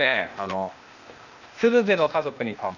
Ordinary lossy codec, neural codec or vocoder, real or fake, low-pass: none; codec, 16 kHz, 0.8 kbps, ZipCodec; fake; 7.2 kHz